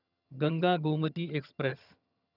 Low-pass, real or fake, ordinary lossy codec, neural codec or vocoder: 5.4 kHz; fake; none; vocoder, 22.05 kHz, 80 mel bands, HiFi-GAN